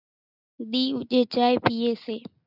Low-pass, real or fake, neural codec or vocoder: 5.4 kHz; real; none